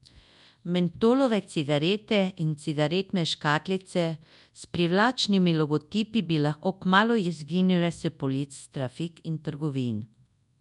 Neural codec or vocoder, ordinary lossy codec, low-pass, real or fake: codec, 24 kHz, 0.9 kbps, WavTokenizer, large speech release; none; 10.8 kHz; fake